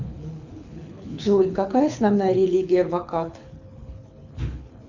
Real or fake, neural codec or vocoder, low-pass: fake; codec, 24 kHz, 6 kbps, HILCodec; 7.2 kHz